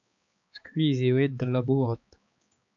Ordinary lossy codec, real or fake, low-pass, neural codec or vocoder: AAC, 64 kbps; fake; 7.2 kHz; codec, 16 kHz, 4 kbps, X-Codec, HuBERT features, trained on balanced general audio